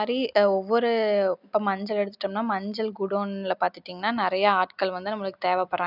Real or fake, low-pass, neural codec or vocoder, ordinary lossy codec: real; 5.4 kHz; none; none